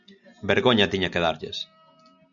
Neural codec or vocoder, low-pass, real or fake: none; 7.2 kHz; real